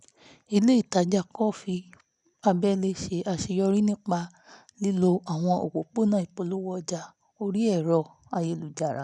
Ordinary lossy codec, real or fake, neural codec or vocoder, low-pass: none; real; none; 10.8 kHz